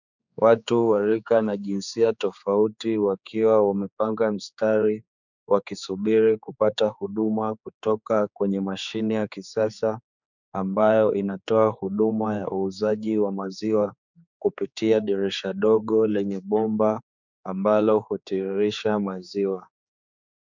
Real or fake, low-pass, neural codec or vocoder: fake; 7.2 kHz; codec, 16 kHz, 4 kbps, X-Codec, HuBERT features, trained on general audio